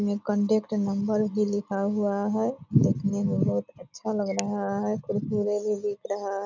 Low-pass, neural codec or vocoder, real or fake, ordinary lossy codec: 7.2 kHz; none; real; none